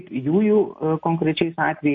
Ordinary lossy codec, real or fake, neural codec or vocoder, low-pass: MP3, 32 kbps; real; none; 7.2 kHz